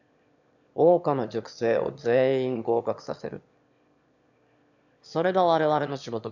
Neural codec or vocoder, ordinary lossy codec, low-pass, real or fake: autoencoder, 22.05 kHz, a latent of 192 numbers a frame, VITS, trained on one speaker; none; 7.2 kHz; fake